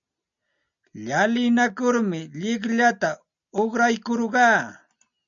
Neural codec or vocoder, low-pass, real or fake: none; 7.2 kHz; real